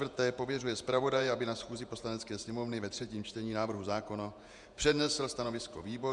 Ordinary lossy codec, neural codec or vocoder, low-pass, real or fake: MP3, 96 kbps; vocoder, 48 kHz, 128 mel bands, Vocos; 10.8 kHz; fake